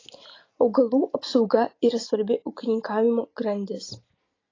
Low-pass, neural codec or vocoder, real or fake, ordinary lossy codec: 7.2 kHz; none; real; AAC, 32 kbps